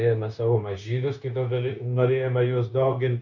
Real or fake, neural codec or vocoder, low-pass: fake; codec, 16 kHz, 0.9 kbps, LongCat-Audio-Codec; 7.2 kHz